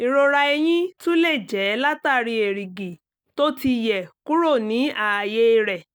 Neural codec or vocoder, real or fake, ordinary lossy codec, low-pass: none; real; none; none